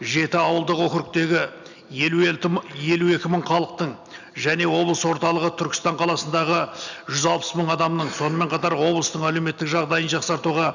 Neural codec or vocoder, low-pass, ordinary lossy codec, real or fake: none; 7.2 kHz; none; real